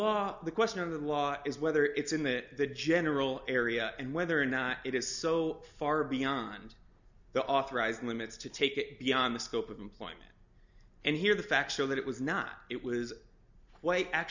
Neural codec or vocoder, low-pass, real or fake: none; 7.2 kHz; real